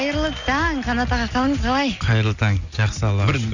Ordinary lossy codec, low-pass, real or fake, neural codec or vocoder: none; 7.2 kHz; real; none